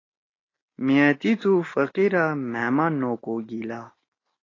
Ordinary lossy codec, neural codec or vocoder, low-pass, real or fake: AAC, 32 kbps; none; 7.2 kHz; real